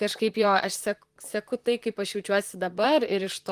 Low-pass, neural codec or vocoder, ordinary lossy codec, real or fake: 14.4 kHz; vocoder, 44.1 kHz, 128 mel bands, Pupu-Vocoder; Opus, 24 kbps; fake